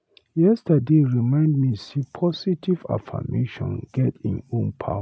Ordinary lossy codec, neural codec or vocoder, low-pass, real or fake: none; none; none; real